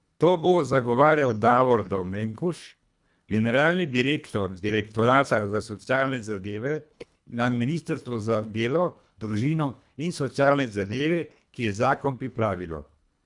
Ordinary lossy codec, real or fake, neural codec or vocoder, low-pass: none; fake; codec, 24 kHz, 1.5 kbps, HILCodec; 10.8 kHz